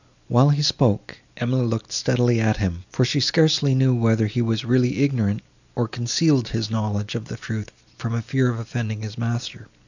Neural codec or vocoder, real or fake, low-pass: none; real; 7.2 kHz